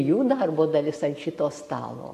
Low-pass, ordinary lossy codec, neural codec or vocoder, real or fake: 14.4 kHz; AAC, 64 kbps; none; real